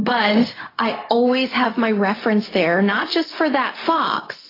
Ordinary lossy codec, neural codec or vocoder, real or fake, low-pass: AAC, 24 kbps; codec, 16 kHz, 0.4 kbps, LongCat-Audio-Codec; fake; 5.4 kHz